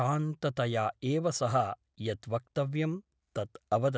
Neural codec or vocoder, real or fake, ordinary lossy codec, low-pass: none; real; none; none